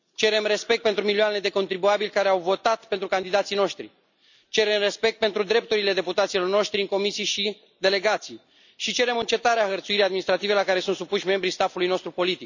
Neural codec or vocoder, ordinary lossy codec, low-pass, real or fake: none; none; 7.2 kHz; real